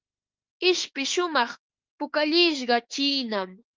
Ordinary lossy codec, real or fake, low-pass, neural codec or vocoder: Opus, 32 kbps; fake; 7.2 kHz; autoencoder, 48 kHz, 32 numbers a frame, DAC-VAE, trained on Japanese speech